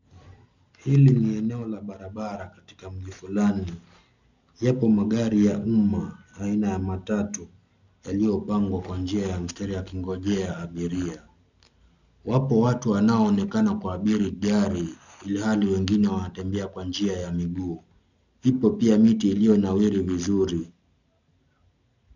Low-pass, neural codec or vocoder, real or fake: 7.2 kHz; none; real